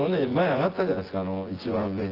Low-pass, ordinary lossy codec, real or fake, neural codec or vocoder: 5.4 kHz; Opus, 16 kbps; fake; vocoder, 24 kHz, 100 mel bands, Vocos